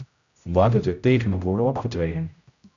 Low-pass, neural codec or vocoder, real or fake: 7.2 kHz; codec, 16 kHz, 0.5 kbps, X-Codec, HuBERT features, trained on general audio; fake